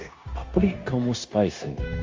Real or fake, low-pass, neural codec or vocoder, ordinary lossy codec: fake; 7.2 kHz; codec, 16 kHz, 0.9 kbps, LongCat-Audio-Codec; Opus, 32 kbps